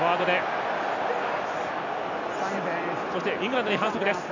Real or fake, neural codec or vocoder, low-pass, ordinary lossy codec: real; none; 7.2 kHz; none